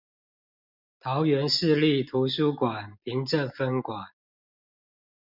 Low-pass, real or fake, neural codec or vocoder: 5.4 kHz; real; none